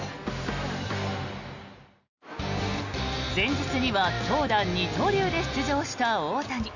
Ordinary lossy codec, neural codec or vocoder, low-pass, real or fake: none; none; 7.2 kHz; real